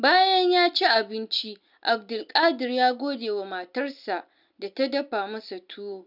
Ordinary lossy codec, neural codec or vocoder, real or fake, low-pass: none; none; real; 5.4 kHz